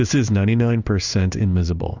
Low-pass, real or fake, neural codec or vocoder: 7.2 kHz; fake; codec, 16 kHz in and 24 kHz out, 1 kbps, XY-Tokenizer